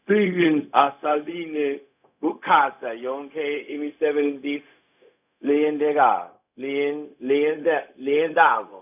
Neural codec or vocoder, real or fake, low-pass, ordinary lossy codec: codec, 16 kHz, 0.4 kbps, LongCat-Audio-Codec; fake; 3.6 kHz; none